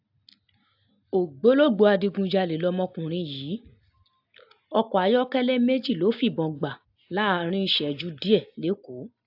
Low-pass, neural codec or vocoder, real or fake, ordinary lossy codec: 5.4 kHz; none; real; AAC, 48 kbps